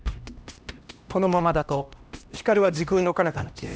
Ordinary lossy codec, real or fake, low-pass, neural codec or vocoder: none; fake; none; codec, 16 kHz, 1 kbps, X-Codec, HuBERT features, trained on LibriSpeech